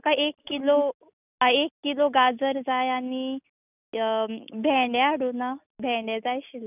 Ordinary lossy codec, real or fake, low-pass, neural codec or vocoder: none; real; 3.6 kHz; none